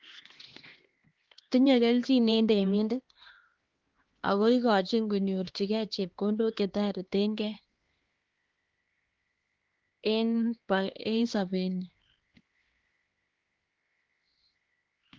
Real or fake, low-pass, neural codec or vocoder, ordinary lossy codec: fake; 7.2 kHz; codec, 16 kHz, 2 kbps, X-Codec, HuBERT features, trained on LibriSpeech; Opus, 16 kbps